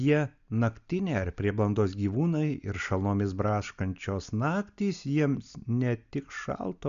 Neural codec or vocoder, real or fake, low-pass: none; real; 7.2 kHz